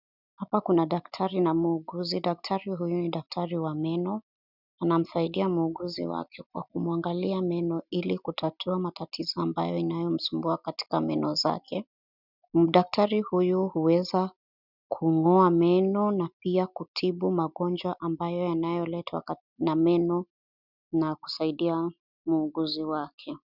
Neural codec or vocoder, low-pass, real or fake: none; 5.4 kHz; real